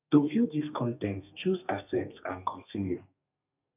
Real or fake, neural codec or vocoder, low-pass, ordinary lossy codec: fake; codec, 32 kHz, 1.9 kbps, SNAC; 3.6 kHz; none